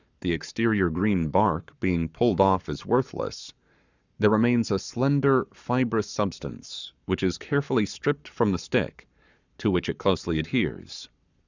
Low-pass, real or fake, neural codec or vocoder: 7.2 kHz; fake; codec, 44.1 kHz, 7.8 kbps, Pupu-Codec